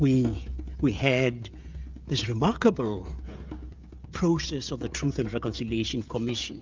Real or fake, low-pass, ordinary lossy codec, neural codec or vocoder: fake; 7.2 kHz; Opus, 24 kbps; codec, 16 kHz, 16 kbps, FreqCodec, smaller model